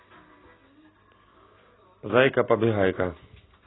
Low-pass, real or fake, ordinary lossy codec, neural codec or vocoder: 7.2 kHz; real; AAC, 16 kbps; none